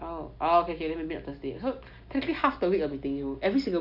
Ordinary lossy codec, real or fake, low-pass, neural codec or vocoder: none; real; 5.4 kHz; none